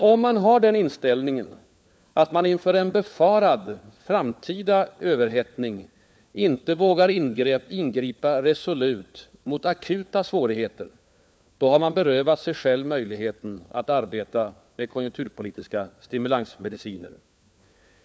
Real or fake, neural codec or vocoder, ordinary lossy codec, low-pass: fake; codec, 16 kHz, 4 kbps, FunCodec, trained on LibriTTS, 50 frames a second; none; none